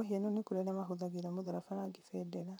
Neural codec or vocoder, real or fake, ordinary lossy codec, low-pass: vocoder, 44.1 kHz, 128 mel bands, Pupu-Vocoder; fake; none; none